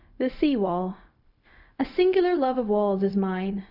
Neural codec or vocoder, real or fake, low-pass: codec, 16 kHz, 0.4 kbps, LongCat-Audio-Codec; fake; 5.4 kHz